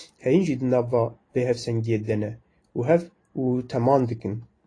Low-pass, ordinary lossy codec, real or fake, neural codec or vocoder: 9.9 kHz; AAC, 32 kbps; real; none